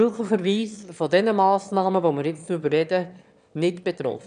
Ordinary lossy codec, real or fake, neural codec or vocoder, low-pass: none; fake; autoencoder, 22.05 kHz, a latent of 192 numbers a frame, VITS, trained on one speaker; 9.9 kHz